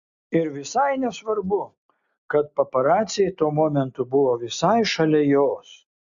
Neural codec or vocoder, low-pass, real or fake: none; 7.2 kHz; real